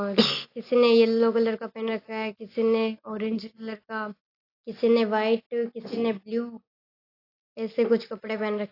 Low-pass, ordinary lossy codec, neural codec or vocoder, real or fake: 5.4 kHz; AAC, 32 kbps; none; real